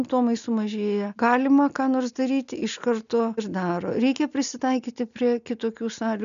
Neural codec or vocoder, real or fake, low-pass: none; real; 7.2 kHz